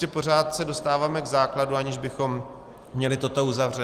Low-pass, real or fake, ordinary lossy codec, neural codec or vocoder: 14.4 kHz; real; Opus, 24 kbps; none